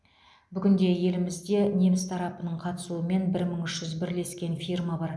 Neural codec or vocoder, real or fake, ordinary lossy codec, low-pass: none; real; none; none